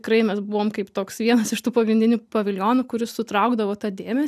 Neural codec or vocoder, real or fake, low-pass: none; real; 14.4 kHz